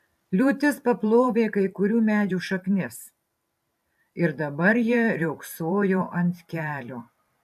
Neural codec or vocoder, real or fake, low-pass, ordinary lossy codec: vocoder, 44.1 kHz, 128 mel bands every 512 samples, BigVGAN v2; fake; 14.4 kHz; AAC, 96 kbps